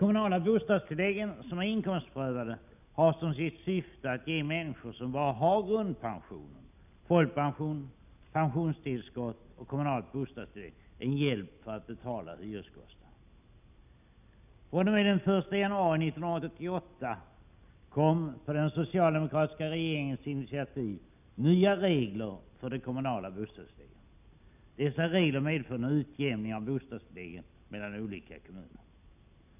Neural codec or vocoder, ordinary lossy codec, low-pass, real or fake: none; none; 3.6 kHz; real